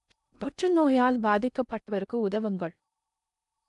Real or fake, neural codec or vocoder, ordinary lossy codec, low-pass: fake; codec, 16 kHz in and 24 kHz out, 0.8 kbps, FocalCodec, streaming, 65536 codes; none; 10.8 kHz